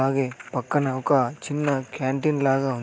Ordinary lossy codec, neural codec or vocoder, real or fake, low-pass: none; none; real; none